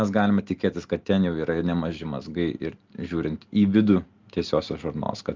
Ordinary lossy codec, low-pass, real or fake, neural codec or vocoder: Opus, 24 kbps; 7.2 kHz; real; none